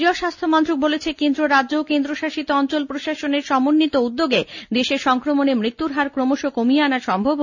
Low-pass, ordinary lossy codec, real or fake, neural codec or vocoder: 7.2 kHz; none; real; none